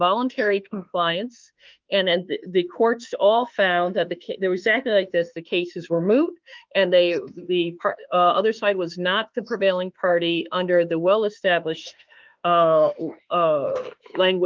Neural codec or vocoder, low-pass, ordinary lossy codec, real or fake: autoencoder, 48 kHz, 32 numbers a frame, DAC-VAE, trained on Japanese speech; 7.2 kHz; Opus, 24 kbps; fake